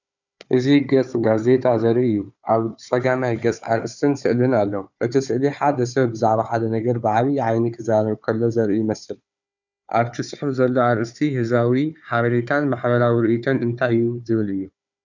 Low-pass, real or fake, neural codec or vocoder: 7.2 kHz; fake; codec, 16 kHz, 4 kbps, FunCodec, trained on Chinese and English, 50 frames a second